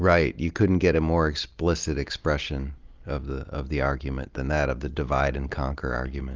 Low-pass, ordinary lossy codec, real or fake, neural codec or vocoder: 7.2 kHz; Opus, 32 kbps; real; none